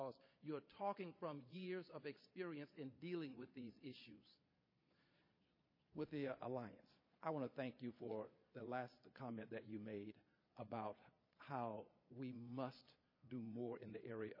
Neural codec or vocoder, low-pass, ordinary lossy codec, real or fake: vocoder, 22.05 kHz, 80 mel bands, Vocos; 5.4 kHz; MP3, 24 kbps; fake